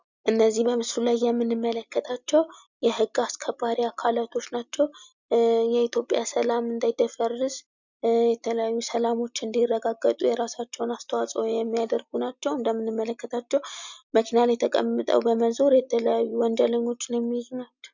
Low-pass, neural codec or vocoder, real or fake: 7.2 kHz; none; real